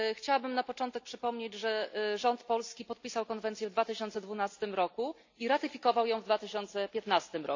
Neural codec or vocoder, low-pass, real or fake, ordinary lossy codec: none; 7.2 kHz; real; AAC, 48 kbps